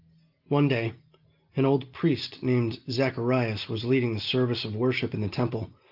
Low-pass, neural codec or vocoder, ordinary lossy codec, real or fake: 5.4 kHz; none; Opus, 32 kbps; real